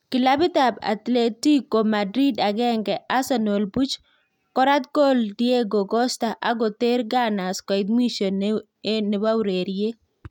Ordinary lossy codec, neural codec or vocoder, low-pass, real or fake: none; none; 19.8 kHz; real